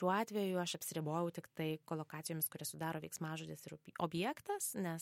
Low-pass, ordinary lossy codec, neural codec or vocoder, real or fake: 19.8 kHz; MP3, 64 kbps; none; real